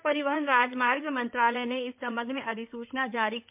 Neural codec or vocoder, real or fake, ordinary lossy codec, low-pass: codec, 16 kHz in and 24 kHz out, 2.2 kbps, FireRedTTS-2 codec; fake; MP3, 32 kbps; 3.6 kHz